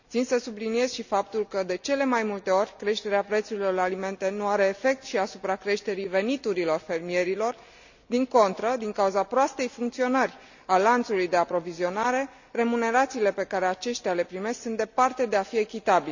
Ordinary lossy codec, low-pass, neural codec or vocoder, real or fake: none; 7.2 kHz; none; real